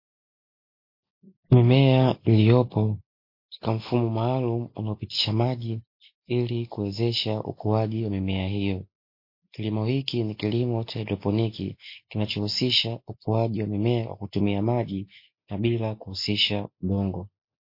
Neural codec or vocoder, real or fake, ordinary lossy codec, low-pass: none; real; MP3, 32 kbps; 5.4 kHz